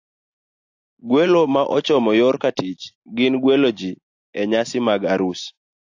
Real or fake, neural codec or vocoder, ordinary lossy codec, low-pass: real; none; AAC, 48 kbps; 7.2 kHz